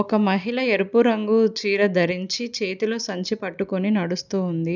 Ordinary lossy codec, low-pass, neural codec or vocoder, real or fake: none; 7.2 kHz; none; real